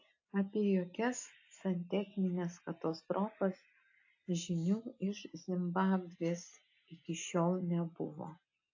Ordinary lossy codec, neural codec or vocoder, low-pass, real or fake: AAC, 48 kbps; none; 7.2 kHz; real